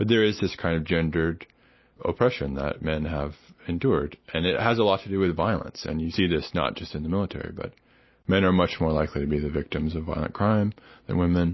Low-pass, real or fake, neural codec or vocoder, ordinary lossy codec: 7.2 kHz; real; none; MP3, 24 kbps